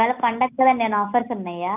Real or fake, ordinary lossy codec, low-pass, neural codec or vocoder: real; none; 3.6 kHz; none